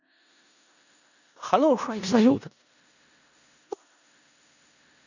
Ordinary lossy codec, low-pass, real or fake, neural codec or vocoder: none; 7.2 kHz; fake; codec, 16 kHz in and 24 kHz out, 0.4 kbps, LongCat-Audio-Codec, four codebook decoder